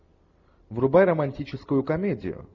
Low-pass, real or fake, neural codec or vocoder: 7.2 kHz; real; none